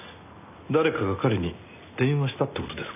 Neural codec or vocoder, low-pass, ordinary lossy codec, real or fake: none; 3.6 kHz; none; real